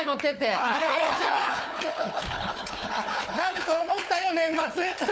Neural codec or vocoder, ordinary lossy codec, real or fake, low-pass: codec, 16 kHz, 4 kbps, FunCodec, trained on Chinese and English, 50 frames a second; none; fake; none